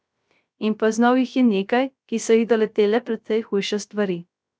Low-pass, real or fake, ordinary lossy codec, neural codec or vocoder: none; fake; none; codec, 16 kHz, 0.3 kbps, FocalCodec